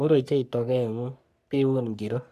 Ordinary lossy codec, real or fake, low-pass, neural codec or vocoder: MP3, 96 kbps; fake; 14.4 kHz; codec, 44.1 kHz, 3.4 kbps, Pupu-Codec